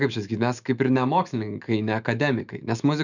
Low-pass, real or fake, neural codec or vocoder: 7.2 kHz; fake; autoencoder, 48 kHz, 128 numbers a frame, DAC-VAE, trained on Japanese speech